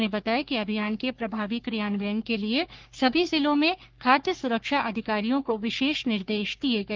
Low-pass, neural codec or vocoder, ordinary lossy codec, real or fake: 7.2 kHz; codec, 44.1 kHz, 3.4 kbps, Pupu-Codec; Opus, 32 kbps; fake